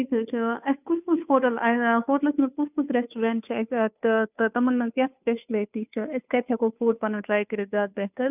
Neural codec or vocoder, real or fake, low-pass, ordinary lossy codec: codec, 16 kHz, 2 kbps, FunCodec, trained on Chinese and English, 25 frames a second; fake; 3.6 kHz; none